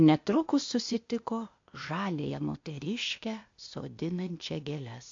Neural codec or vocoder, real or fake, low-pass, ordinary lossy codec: codec, 16 kHz, 0.8 kbps, ZipCodec; fake; 7.2 kHz; MP3, 48 kbps